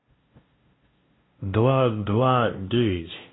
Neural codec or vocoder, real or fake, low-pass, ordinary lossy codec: codec, 16 kHz, 0.5 kbps, FunCodec, trained on LibriTTS, 25 frames a second; fake; 7.2 kHz; AAC, 16 kbps